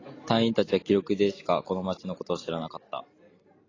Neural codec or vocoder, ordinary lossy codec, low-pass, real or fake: none; AAC, 32 kbps; 7.2 kHz; real